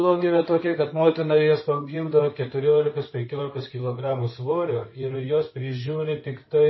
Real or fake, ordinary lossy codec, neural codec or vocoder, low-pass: fake; MP3, 24 kbps; codec, 16 kHz in and 24 kHz out, 2.2 kbps, FireRedTTS-2 codec; 7.2 kHz